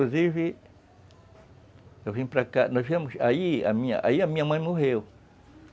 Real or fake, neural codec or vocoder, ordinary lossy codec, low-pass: real; none; none; none